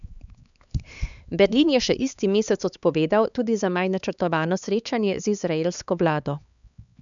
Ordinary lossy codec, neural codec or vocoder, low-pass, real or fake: none; codec, 16 kHz, 4 kbps, X-Codec, HuBERT features, trained on LibriSpeech; 7.2 kHz; fake